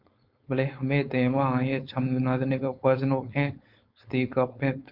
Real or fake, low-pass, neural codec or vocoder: fake; 5.4 kHz; codec, 16 kHz, 4.8 kbps, FACodec